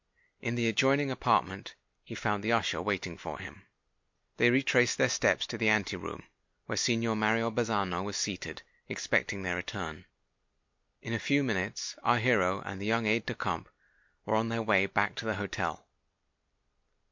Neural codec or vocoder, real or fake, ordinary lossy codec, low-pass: none; real; MP3, 48 kbps; 7.2 kHz